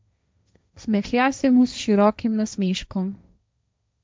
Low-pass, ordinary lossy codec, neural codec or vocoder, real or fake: none; none; codec, 16 kHz, 1.1 kbps, Voila-Tokenizer; fake